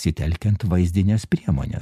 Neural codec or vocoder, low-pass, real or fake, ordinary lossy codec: none; 14.4 kHz; real; MP3, 96 kbps